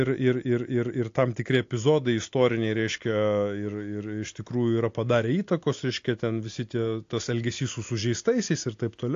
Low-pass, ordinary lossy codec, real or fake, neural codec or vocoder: 7.2 kHz; AAC, 48 kbps; real; none